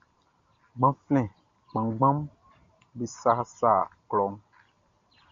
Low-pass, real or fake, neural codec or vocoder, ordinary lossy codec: 7.2 kHz; real; none; MP3, 48 kbps